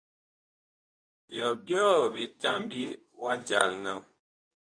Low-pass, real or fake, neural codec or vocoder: 9.9 kHz; fake; codec, 24 kHz, 0.9 kbps, WavTokenizer, medium speech release version 2